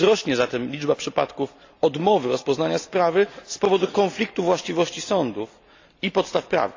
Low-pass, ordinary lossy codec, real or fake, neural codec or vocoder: 7.2 kHz; AAC, 48 kbps; real; none